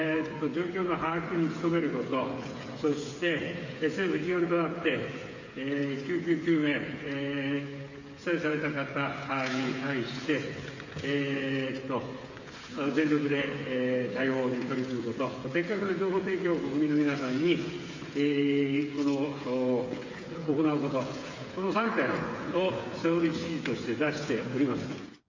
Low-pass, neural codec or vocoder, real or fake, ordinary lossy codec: 7.2 kHz; codec, 16 kHz, 8 kbps, FreqCodec, smaller model; fake; MP3, 32 kbps